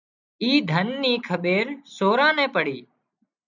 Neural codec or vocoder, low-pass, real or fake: none; 7.2 kHz; real